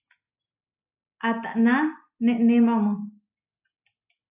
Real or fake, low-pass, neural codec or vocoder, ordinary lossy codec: real; 3.6 kHz; none; AAC, 32 kbps